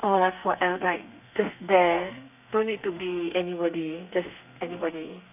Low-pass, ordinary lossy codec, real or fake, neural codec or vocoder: 3.6 kHz; none; fake; codec, 32 kHz, 1.9 kbps, SNAC